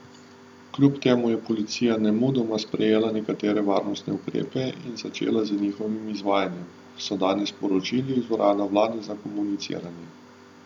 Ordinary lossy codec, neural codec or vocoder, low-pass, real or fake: none; none; 19.8 kHz; real